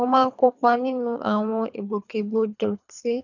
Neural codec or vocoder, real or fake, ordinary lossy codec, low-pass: codec, 24 kHz, 3 kbps, HILCodec; fake; none; 7.2 kHz